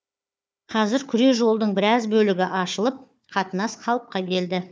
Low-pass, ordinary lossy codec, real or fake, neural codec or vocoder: none; none; fake; codec, 16 kHz, 4 kbps, FunCodec, trained on Chinese and English, 50 frames a second